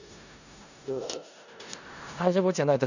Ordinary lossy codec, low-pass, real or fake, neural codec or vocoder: none; 7.2 kHz; fake; codec, 16 kHz in and 24 kHz out, 0.4 kbps, LongCat-Audio-Codec, four codebook decoder